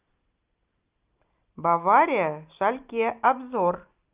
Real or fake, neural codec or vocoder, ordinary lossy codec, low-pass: real; none; Opus, 24 kbps; 3.6 kHz